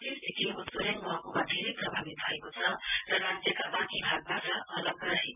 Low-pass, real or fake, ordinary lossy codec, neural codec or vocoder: 3.6 kHz; real; none; none